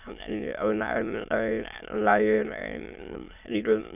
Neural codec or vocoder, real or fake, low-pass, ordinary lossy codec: autoencoder, 22.05 kHz, a latent of 192 numbers a frame, VITS, trained on many speakers; fake; 3.6 kHz; none